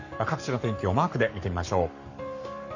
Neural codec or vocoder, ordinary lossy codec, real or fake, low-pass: codec, 44.1 kHz, 7.8 kbps, Pupu-Codec; none; fake; 7.2 kHz